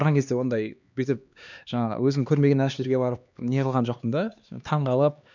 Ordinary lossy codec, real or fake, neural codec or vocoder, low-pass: none; fake; codec, 16 kHz, 2 kbps, X-Codec, HuBERT features, trained on LibriSpeech; 7.2 kHz